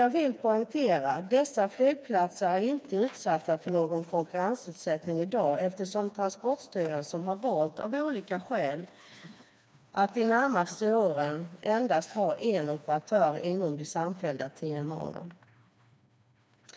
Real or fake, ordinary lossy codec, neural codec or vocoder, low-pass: fake; none; codec, 16 kHz, 2 kbps, FreqCodec, smaller model; none